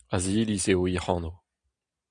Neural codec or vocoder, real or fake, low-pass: none; real; 9.9 kHz